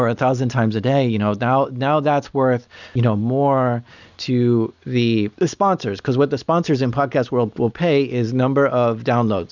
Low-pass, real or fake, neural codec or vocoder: 7.2 kHz; real; none